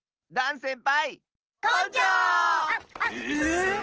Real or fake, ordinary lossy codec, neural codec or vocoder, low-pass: real; Opus, 16 kbps; none; 7.2 kHz